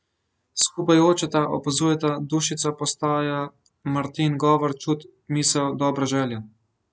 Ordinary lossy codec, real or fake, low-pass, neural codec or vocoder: none; real; none; none